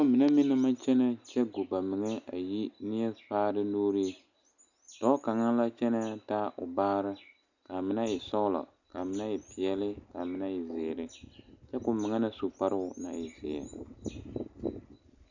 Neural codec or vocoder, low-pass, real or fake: none; 7.2 kHz; real